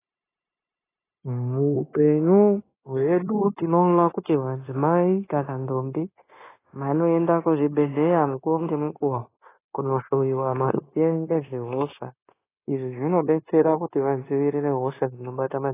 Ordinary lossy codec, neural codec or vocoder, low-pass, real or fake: AAC, 16 kbps; codec, 16 kHz, 0.9 kbps, LongCat-Audio-Codec; 3.6 kHz; fake